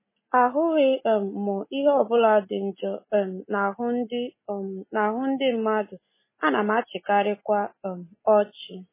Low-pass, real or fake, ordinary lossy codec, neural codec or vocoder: 3.6 kHz; real; MP3, 16 kbps; none